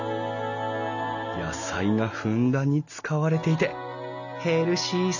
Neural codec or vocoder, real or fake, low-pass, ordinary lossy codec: none; real; 7.2 kHz; none